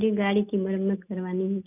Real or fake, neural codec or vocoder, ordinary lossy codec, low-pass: real; none; none; 3.6 kHz